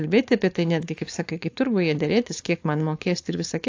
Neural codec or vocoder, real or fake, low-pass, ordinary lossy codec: codec, 16 kHz, 4.8 kbps, FACodec; fake; 7.2 kHz; AAC, 48 kbps